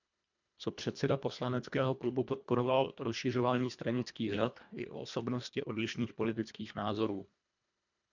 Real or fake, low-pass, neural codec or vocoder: fake; 7.2 kHz; codec, 24 kHz, 1.5 kbps, HILCodec